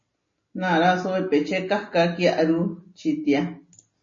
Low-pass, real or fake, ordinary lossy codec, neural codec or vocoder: 7.2 kHz; real; MP3, 32 kbps; none